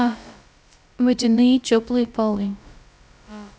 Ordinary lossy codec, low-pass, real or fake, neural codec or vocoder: none; none; fake; codec, 16 kHz, about 1 kbps, DyCAST, with the encoder's durations